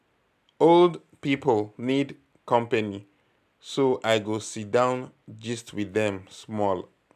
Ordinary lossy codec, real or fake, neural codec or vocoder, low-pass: none; real; none; 14.4 kHz